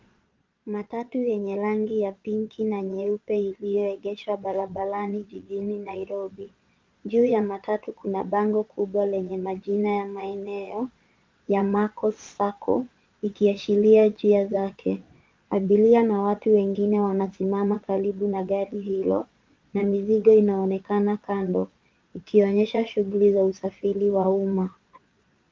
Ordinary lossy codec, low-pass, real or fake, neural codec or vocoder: Opus, 24 kbps; 7.2 kHz; fake; vocoder, 22.05 kHz, 80 mel bands, Vocos